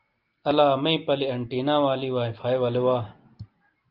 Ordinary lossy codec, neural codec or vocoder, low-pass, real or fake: Opus, 32 kbps; none; 5.4 kHz; real